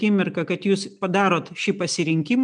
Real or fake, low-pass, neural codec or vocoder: real; 9.9 kHz; none